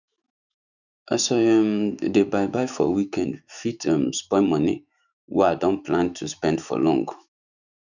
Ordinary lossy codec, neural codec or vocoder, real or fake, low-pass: none; autoencoder, 48 kHz, 128 numbers a frame, DAC-VAE, trained on Japanese speech; fake; 7.2 kHz